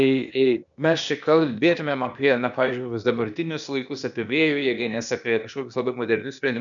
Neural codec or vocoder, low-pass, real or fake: codec, 16 kHz, 0.8 kbps, ZipCodec; 7.2 kHz; fake